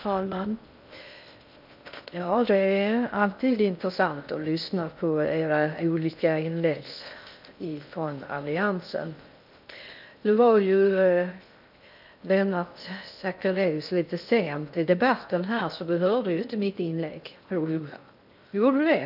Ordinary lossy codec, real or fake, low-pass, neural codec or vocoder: none; fake; 5.4 kHz; codec, 16 kHz in and 24 kHz out, 0.6 kbps, FocalCodec, streaming, 4096 codes